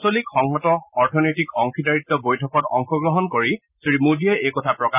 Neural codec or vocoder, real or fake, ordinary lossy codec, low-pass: none; real; none; 3.6 kHz